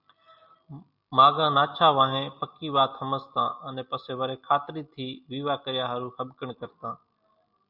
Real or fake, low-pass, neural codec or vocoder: real; 5.4 kHz; none